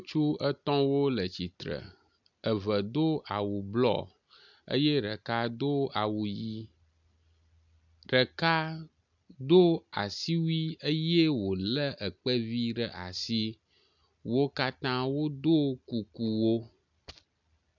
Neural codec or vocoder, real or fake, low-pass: none; real; 7.2 kHz